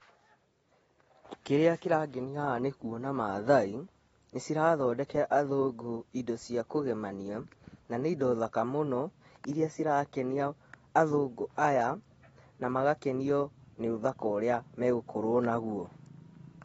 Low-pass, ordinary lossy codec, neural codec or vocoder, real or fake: 19.8 kHz; AAC, 24 kbps; none; real